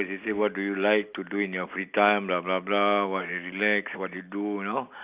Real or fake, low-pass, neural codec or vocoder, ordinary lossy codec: real; 3.6 kHz; none; Opus, 64 kbps